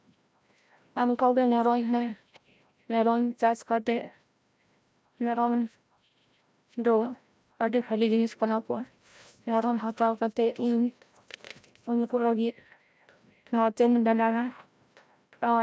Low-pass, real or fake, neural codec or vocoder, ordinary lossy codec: none; fake; codec, 16 kHz, 0.5 kbps, FreqCodec, larger model; none